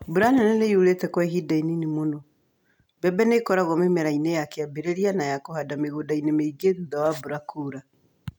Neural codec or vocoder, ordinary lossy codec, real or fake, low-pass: none; none; real; 19.8 kHz